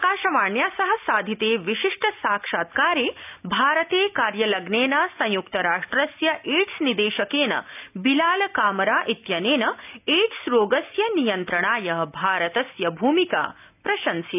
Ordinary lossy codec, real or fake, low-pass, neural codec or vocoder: none; real; 3.6 kHz; none